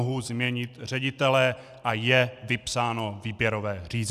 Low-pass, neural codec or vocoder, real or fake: 14.4 kHz; none; real